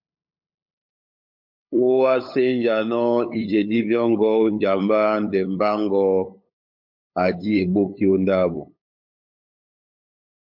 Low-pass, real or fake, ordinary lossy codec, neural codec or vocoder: 5.4 kHz; fake; MP3, 48 kbps; codec, 16 kHz, 8 kbps, FunCodec, trained on LibriTTS, 25 frames a second